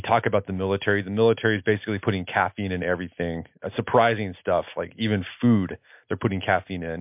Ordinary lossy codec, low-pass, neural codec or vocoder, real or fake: MP3, 32 kbps; 3.6 kHz; none; real